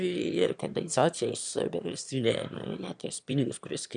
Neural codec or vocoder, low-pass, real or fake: autoencoder, 22.05 kHz, a latent of 192 numbers a frame, VITS, trained on one speaker; 9.9 kHz; fake